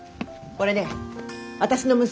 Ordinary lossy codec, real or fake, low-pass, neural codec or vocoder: none; real; none; none